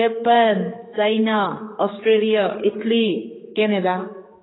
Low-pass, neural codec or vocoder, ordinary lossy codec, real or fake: 7.2 kHz; codec, 16 kHz, 4 kbps, X-Codec, HuBERT features, trained on general audio; AAC, 16 kbps; fake